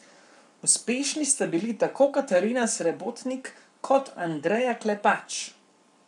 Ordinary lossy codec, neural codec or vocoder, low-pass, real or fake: none; codec, 44.1 kHz, 7.8 kbps, Pupu-Codec; 10.8 kHz; fake